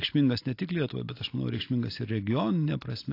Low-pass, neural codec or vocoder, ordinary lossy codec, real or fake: 5.4 kHz; none; AAC, 32 kbps; real